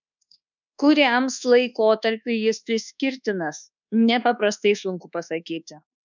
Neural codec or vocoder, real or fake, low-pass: codec, 24 kHz, 1.2 kbps, DualCodec; fake; 7.2 kHz